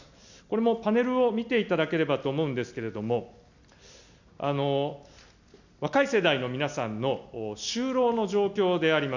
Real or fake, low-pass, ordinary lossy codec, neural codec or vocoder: real; 7.2 kHz; none; none